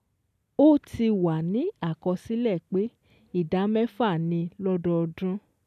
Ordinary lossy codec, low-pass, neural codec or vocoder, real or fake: none; 14.4 kHz; none; real